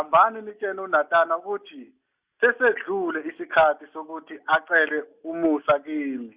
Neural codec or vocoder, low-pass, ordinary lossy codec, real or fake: none; 3.6 kHz; Opus, 64 kbps; real